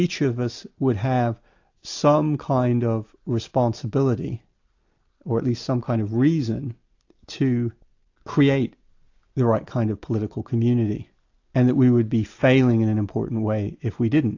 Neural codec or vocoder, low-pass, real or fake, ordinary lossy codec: none; 7.2 kHz; real; AAC, 48 kbps